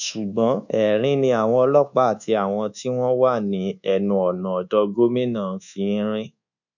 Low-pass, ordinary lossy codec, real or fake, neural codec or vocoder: 7.2 kHz; none; fake; codec, 24 kHz, 1.2 kbps, DualCodec